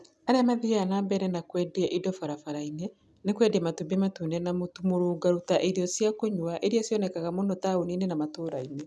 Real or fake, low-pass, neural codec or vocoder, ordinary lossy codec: real; none; none; none